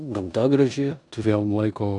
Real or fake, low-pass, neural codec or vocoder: fake; 10.8 kHz; codec, 16 kHz in and 24 kHz out, 0.9 kbps, LongCat-Audio-Codec, four codebook decoder